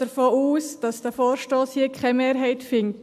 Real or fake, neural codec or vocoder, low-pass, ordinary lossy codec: real; none; 14.4 kHz; none